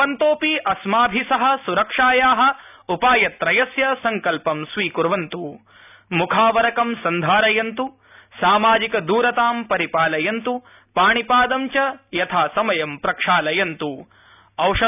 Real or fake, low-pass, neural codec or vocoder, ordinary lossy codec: real; 3.6 kHz; none; none